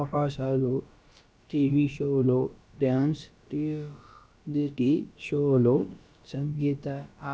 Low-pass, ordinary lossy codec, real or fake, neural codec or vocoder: none; none; fake; codec, 16 kHz, about 1 kbps, DyCAST, with the encoder's durations